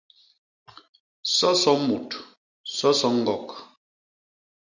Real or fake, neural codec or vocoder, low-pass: real; none; 7.2 kHz